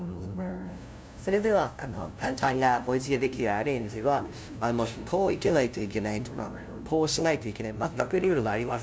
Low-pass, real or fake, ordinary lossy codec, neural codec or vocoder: none; fake; none; codec, 16 kHz, 0.5 kbps, FunCodec, trained on LibriTTS, 25 frames a second